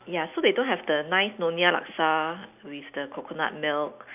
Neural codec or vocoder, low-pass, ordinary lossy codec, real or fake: none; 3.6 kHz; none; real